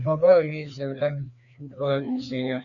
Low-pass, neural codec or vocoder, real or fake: 7.2 kHz; codec, 16 kHz, 2 kbps, FreqCodec, larger model; fake